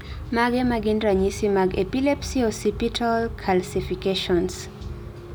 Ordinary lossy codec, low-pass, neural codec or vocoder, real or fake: none; none; none; real